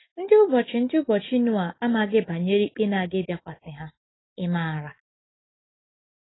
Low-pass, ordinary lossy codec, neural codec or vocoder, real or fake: 7.2 kHz; AAC, 16 kbps; none; real